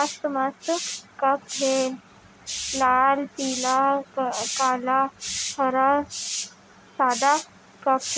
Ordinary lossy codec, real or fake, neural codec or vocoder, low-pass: none; real; none; none